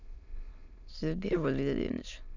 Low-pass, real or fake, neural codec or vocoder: 7.2 kHz; fake; autoencoder, 22.05 kHz, a latent of 192 numbers a frame, VITS, trained on many speakers